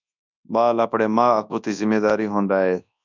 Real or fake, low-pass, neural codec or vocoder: fake; 7.2 kHz; codec, 24 kHz, 0.9 kbps, WavTokenizer, large speech release